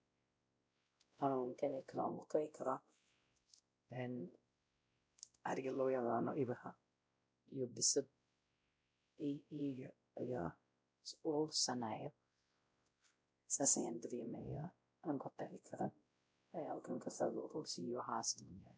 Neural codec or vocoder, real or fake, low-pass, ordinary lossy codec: codec, 16 kHz, 0.5 kbps, X-Codec, WavLM features, trained on Multilingual LibriSpeech; fake; none; none